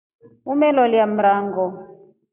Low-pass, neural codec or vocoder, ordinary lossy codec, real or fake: 3.6 kHz; none; AAC, 24 kbps; real